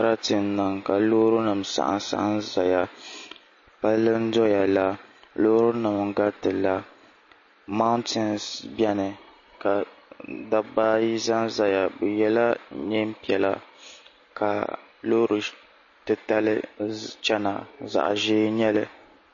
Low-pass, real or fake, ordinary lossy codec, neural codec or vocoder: 7.2 kHz; real; MP3, 32 kbps; none